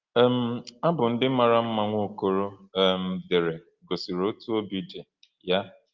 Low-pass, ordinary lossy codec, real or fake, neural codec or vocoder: 7.2 kHz; Opus, 32 kbps; real; none